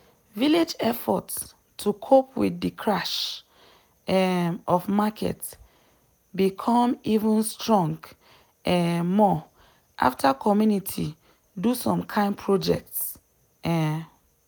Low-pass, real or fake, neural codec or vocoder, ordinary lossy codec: none; real; none; none